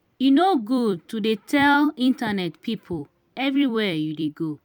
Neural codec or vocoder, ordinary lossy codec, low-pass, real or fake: vocoder, 44.1 kHz, 128 mel bands every 256 samples, BigVGAN v2; none; 19.8 kHz; fake